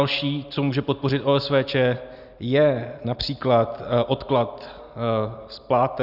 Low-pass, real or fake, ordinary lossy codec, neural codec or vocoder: 5.4 kHz; real; Opus, 64 kbps; none